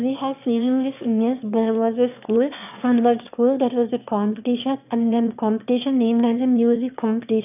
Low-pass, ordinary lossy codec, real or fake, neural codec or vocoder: 3.6 kHz; none; fake; autoencoder, 22.05 kHz, a latent of 192 numbers a frame, VITS, trained on one speaker